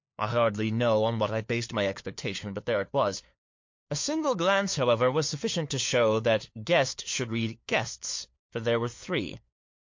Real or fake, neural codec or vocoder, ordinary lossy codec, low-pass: fake; codec, 16 kHz, 4 kbps, FunCodec, trained on LibriTTS, 50 frames a second; MP3, 48 kbps; 7.2 kHz